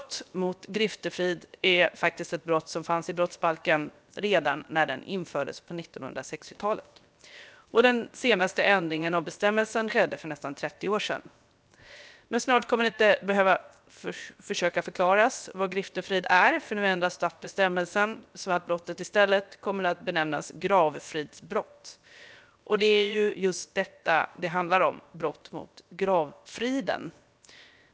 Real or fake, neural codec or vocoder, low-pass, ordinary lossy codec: fake; codec, 16 kHz, 0.7 kbps, FocalCodec; none; none